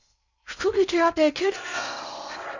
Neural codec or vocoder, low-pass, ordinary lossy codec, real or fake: codec, 16 kHz in and 24 kHz out, 0.6 kbps, FocalCodec, streaming, 4096 codes; 7.2 kHz; Opus, 64 kbps; fake